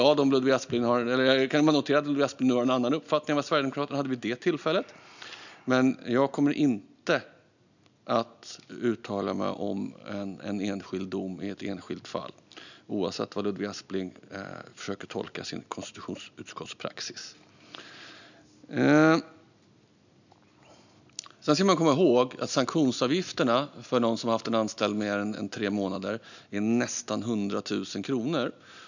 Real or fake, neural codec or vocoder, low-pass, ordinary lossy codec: real; none; 7.2 kHz; none